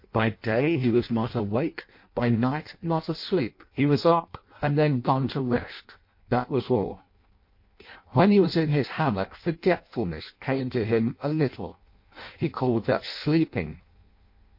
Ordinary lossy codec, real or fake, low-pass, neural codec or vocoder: MP3, 32 kbps; fake; 5.4 kHz; codec, 16 kHz in and 24 kHz out, 0.6 kbps, FireRedTTS-2 codec